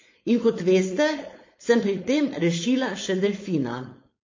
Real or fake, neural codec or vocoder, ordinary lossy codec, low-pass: fake; codec, 16 kHz, 4.8 kbps, FACodec; MP3, 32 kbps; 7.2 kHz